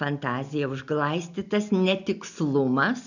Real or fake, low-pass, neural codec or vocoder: real; 7.2 kHz; none